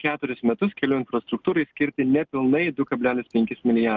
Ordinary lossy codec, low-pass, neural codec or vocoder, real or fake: Opus, 32 kbps; 7.2 kHz; none; real